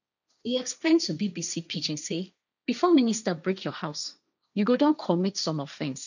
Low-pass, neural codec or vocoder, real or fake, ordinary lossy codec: 7.2 kHz; codec, 16 kHz, 1.1 kbps, Voila-Tokenizer; fake; none